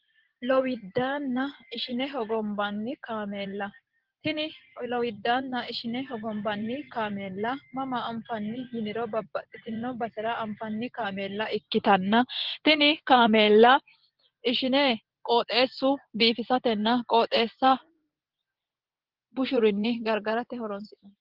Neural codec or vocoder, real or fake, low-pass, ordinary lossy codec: vocoder, 24 kHz, 100 mel bands, Vocos; fake; 5.4 kHz; Opus, 16 kbps